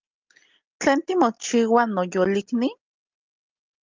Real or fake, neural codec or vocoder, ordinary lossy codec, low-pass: real; none; Opus, 32 kbps; 7.2 kHz